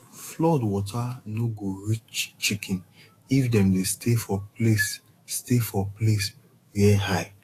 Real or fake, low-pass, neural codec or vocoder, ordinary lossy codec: fake; 14.4 kHz; autoencoder, 48 kHz, 128 numbers a frame, DAC-VAE, trained on Japanese speech; AAC, 48 kbps